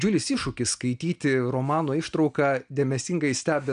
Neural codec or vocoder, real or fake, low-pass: none; real; 9.9 kHz